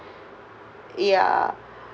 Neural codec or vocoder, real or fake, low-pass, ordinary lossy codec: none; real; none; none